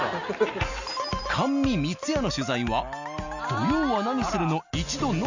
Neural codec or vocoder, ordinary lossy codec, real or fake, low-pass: none; Opus, 64 kbps; real; 7.2 kHz